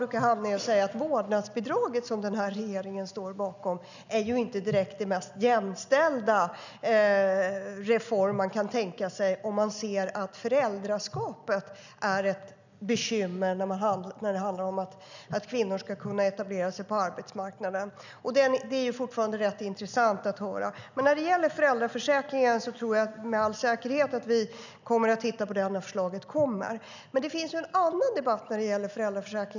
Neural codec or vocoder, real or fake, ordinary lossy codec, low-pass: none; real; none; 7.2 kHz